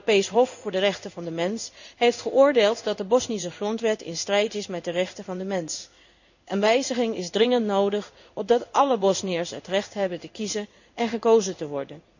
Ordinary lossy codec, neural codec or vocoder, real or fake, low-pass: none; codec, 16 kHz in and 24 kHz out, 1 kbps, XY-Tokenizer; fake; 7.2 kHz